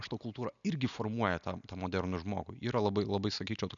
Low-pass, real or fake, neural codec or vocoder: 7.2 kHz; real; none